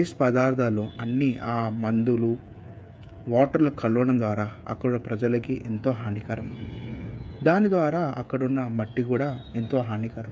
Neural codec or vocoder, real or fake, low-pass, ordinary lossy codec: codec, 16 kHz, 8 kbps, FreqCodec, smaller model; fake; none; none